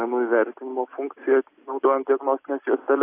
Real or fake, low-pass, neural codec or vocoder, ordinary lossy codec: real; 3.6 kHz; none; AAC, 24 kbps